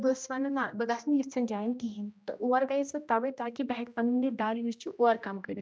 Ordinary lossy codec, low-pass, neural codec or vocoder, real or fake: none; none; codec, 16 kHz, 1 kbps, X-Codec, HuBERT features, trained on general audio; fake